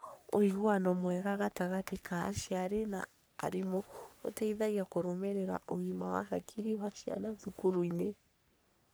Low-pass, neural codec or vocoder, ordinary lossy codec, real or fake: none; codec, 44.1 kHz, 3.4 kbps, Pupu-Codec; none; fake